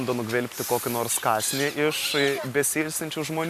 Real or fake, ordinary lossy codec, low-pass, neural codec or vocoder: real; MP3, 96 kbps; 14.4 kHz; none